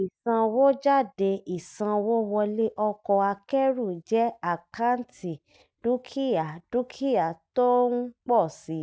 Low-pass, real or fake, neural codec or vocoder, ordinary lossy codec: none; real; none; none